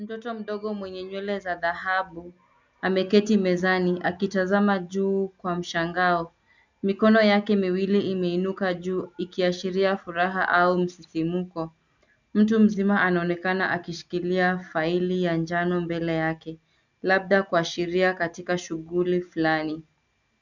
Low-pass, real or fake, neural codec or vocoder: 7.2 kHz; real; none